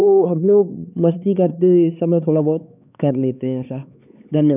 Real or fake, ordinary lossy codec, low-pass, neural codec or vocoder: fake; none; 3.6 kHz; codec, 16 kHz, 4 kbps, X-Codec, HuBERT features, trained on LibriSpeech